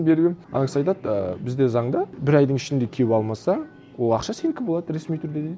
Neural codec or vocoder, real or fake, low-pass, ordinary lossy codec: none; real; none; none